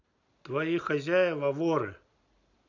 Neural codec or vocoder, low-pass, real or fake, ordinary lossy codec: none; 7.2 kHz; real; none